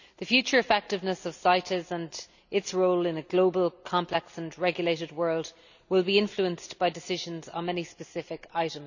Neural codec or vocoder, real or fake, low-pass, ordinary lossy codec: none; real; 7.2 kHz; none